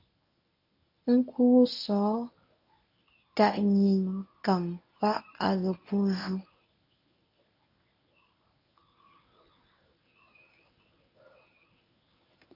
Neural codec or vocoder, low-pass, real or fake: codec, 24 kHz, 0.9 kbps, WavTokenizer, medium speech release version 1; 5.4 kHz; fake